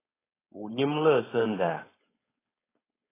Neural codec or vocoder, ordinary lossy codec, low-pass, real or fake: codec, 16 kHz, 4.8 kbps, FACodec; AAC, 16 kbps; 3.6 kHz; fake